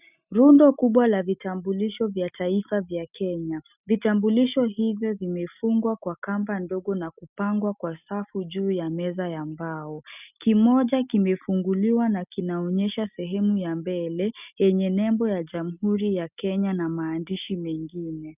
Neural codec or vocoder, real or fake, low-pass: none; real; 3.6 kHz